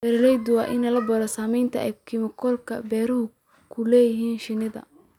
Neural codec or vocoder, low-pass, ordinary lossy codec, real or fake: none; 19.8 kHz; none; real